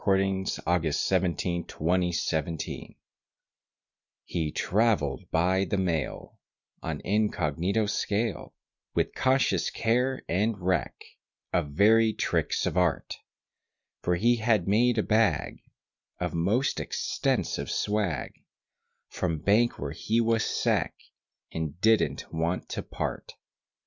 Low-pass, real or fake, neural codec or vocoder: 7.2 kHz; real; none